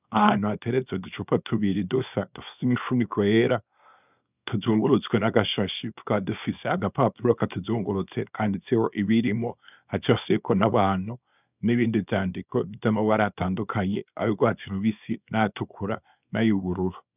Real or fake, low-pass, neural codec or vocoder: fake; 3.6 kHz; codec, 24 kHz, 0.9 kbps, WavTokenizer, small release